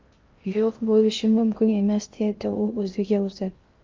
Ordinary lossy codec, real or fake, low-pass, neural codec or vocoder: Opus, 24 kbps; fake; 7.2 kHz; codec, 16 kHz in and 24 kHz out, 0.6 kbps, FocalCodec, streaming, 2048 codes